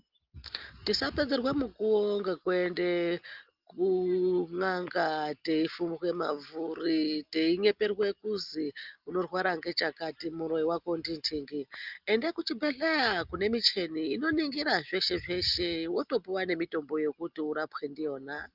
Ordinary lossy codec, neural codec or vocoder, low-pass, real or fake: Opus, 32 kbps; none; 5.4 kHz; real